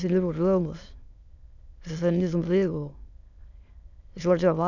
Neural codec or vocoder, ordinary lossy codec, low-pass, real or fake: autoencoder, 22.05 kHz, a latent of 192 numbers a frame, VITS, trained on many speakers; none; 7.2 kHz; fake